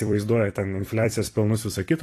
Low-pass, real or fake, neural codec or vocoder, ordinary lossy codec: 14.4 kHz; fake; vocoder, 44.1 kHz, 128 mel bands every 256 samples, BigVGAN v2; AAC, 48 kbps